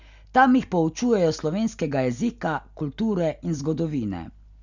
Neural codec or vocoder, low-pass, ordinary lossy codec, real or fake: none; 7.2 kHz; none; real